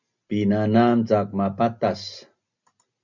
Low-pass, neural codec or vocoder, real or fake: 7.2 kHz; none; real